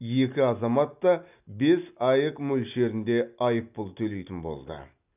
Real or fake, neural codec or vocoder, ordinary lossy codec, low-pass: real; none; none; 3.6 kHz